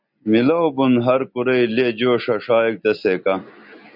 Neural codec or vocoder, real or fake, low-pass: none; real; 5.4 kHz